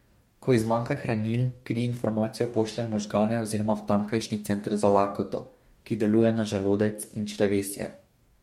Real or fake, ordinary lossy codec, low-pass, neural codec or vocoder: fake; MP3, 64 kbps; 19.8 kHz; codec, 44.1 kHz, 2.6 kbps, DAC